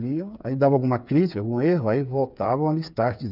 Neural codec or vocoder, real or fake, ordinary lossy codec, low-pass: codec, 16 kHz, 8 kbps, FreqCodec, smaller model; fake; none; 5.4 kHz